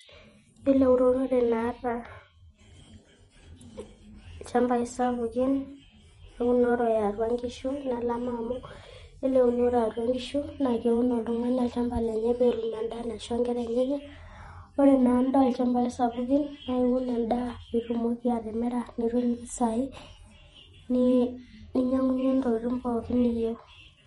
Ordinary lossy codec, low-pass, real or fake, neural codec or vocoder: MP3, 48 kbps; 19.8 kHz; fake; vocoder, 48 kHz, 128 mel bands, Vocos